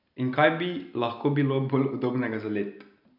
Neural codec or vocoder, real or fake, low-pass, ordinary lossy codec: none; real; 5.4 kHz; none